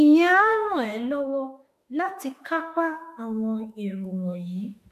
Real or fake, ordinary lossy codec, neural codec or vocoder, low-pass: fake; MP3, 96 kbps; autoencoder, 48 kHz, 32 numbers a frame, DAC-VAE, trained on Japanese speech; 14.4 kHz